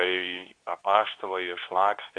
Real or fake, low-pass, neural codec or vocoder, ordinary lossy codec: fake; 9.9 kHz; codec, 24 kHz, 0.9 kbps, WavTokenizer, medium speech release version 2; AAC, 64 kbps